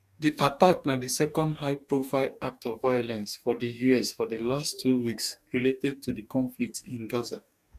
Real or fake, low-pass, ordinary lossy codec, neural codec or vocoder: fake; 14.4 kHz; none; codec, 44.1 kHz, 2.6 kbps, DAC